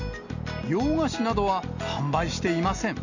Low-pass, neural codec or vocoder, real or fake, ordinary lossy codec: 7.2 kHz; none; real; none